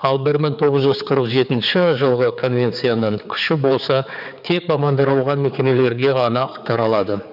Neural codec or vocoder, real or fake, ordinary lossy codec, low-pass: codec, 16 kHz, 4 kbps, X-Codec, HuBERT features, trained on general audio; fake; none; 5.4 kHz